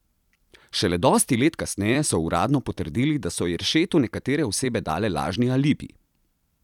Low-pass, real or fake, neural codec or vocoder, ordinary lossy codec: 19.8 kHz; fake; vocoder, 44.1 kHz, 128 mel bands every 256 samples, BigVGAN v2; none